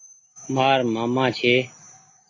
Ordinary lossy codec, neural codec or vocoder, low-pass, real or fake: AAC, 32 kbps; none; 7.2 kHz; real